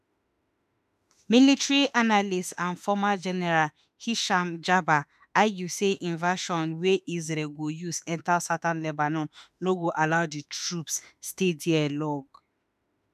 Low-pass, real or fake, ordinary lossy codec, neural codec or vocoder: 14.4 kHz; fake; none; autoencoder, 48 kHz, 32 numbers a frame, DAC-VAE, trained on Japanese speech